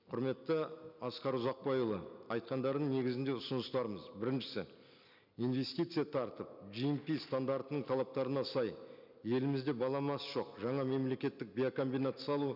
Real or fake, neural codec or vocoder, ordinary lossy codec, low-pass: real; none; none; 5.4 kHz